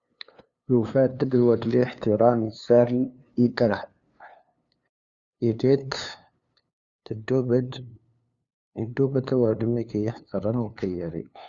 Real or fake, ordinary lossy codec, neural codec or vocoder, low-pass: fake; Opus, 64 kbps; codec, 16 kHz, 2 kbps, FunCodec, trained on LibriTTS, 25 frames a second; 7.2 kHz